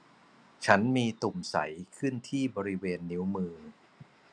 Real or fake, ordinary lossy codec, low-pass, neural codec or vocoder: real; none; none; none